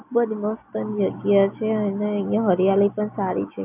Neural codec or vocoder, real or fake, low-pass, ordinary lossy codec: none; real; 3.6 kHz; AAC, 32 kbps